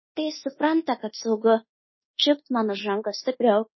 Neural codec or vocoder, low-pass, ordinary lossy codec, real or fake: codec, 24 kHz, 1.2 kbps, DualCodec; 7.2 kHz; MP3, 24 kbps; fake